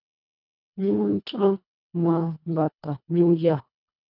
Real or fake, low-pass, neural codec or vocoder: fake; 5.4 kHz; codec, 24 kHz, 1.5 kbps, HILCodec